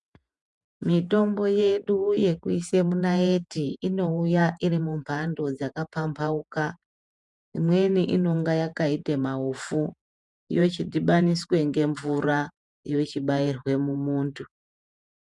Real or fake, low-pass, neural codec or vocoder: fake; 10.8 kHz; vocoder, 48 kHz, 128 mel bands, Vocos